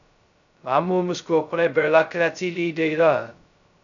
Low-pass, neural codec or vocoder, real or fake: 7.2 kHz; codec, 16 kHz, 0.2 kbps, FocalCodec; fake